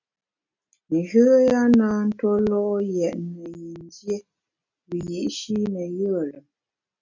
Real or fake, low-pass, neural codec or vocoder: real; 7.2 kHz; none